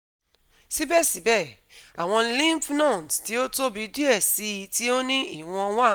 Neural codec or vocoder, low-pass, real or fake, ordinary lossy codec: none; none; real; none